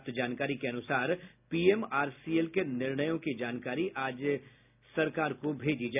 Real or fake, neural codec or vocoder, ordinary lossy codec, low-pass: real; none; none; 3.6 kHz